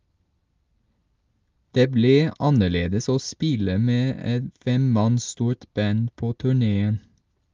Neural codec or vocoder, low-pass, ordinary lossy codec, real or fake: none; 7.2 kHz; Opus, 32 kbps; real